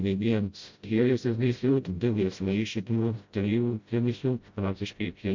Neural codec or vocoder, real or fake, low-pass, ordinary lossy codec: codec, 16 kHz, 0.5 kbps, FreqCodec, smaller model; fake; 7.2 kHz; MP3, 48 kbps